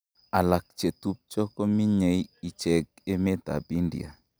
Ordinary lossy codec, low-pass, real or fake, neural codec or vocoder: none; none; real; none